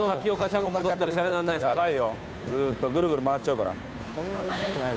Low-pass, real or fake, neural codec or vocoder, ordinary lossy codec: none; fake; codec, 16 kHz, 2 kbps, FunCodec, trained on Chinese and English, 25 frames a second; none